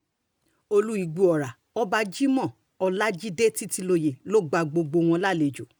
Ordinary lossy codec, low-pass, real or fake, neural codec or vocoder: none; none; real; none